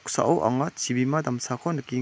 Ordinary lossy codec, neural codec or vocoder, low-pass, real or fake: none; none; none; real